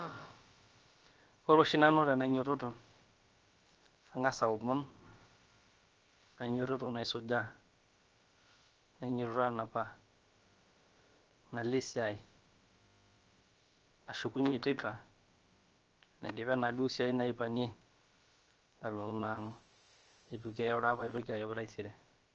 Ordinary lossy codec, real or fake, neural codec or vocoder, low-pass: Opus, 24 kbps; fake; codec, 16 kHz, about 1 kbps, DyCAST, with the encoder's durations; 7.2 kHz